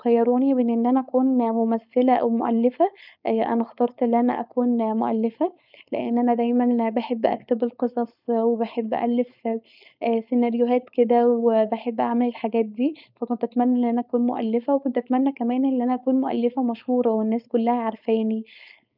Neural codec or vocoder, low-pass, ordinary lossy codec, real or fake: codec, 16 kHz, 4.8 kbps, FACodec; 5.4 kHz; none; fake